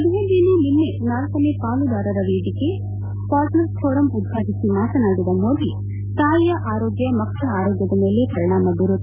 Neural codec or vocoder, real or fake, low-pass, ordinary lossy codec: none; real; 3.6 kHz; none